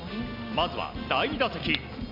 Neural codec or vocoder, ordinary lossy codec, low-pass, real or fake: none; none; 5.4 kHz; real